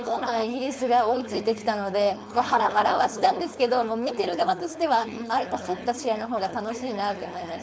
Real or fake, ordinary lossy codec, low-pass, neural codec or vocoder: fake; none; none; codec, 16 kHz, 4.8 kbps, FACodec